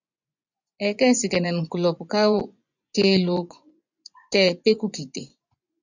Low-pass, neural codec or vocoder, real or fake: 7.2 kHz; vocoder, 24 kHz, 100 mel bands, Vocos; fake